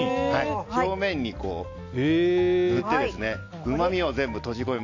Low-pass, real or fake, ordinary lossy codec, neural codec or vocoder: 7.2 kHz; real; AAC, 48 kbps; none